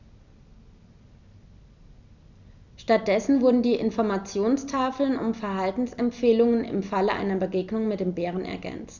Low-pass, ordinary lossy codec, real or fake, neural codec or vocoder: 7.2 kHz; none; real; none